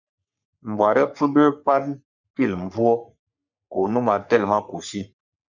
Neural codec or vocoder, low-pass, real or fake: codec, 44.1 kHz, 3.4 kbps, Pupu-Codec; 7.2 kHz; fake